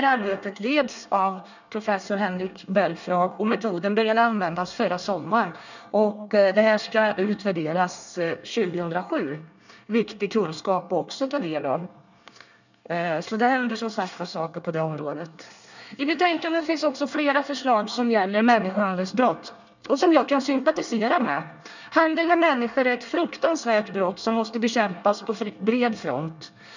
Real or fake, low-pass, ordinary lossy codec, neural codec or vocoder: fake; 7.2 kHz; none; codec, 24 kHz, 1 kbps, SNAC